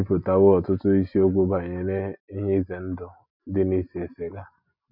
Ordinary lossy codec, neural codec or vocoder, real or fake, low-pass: none; none; real; 5.4 kHz